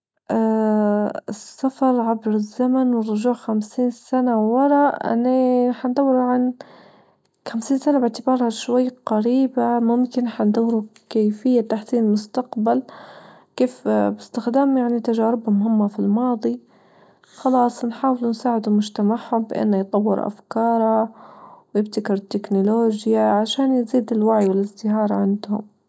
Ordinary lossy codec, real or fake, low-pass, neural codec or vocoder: none; real; none; none